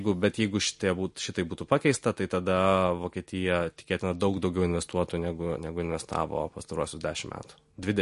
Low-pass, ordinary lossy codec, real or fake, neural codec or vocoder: 14.4 kHz; MP3, 48 kbps; real; none